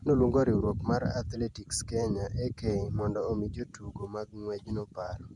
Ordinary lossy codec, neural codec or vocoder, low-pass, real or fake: none; vocoder, 48 kHz, 128 mel bands, Vocos; 10.8 kHz; fake